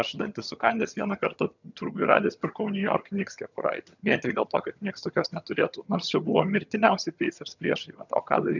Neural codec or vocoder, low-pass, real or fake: vocoder, 22.05 kHz, 80 mel bands, HiFi-GAN; 7.2 kHz; fake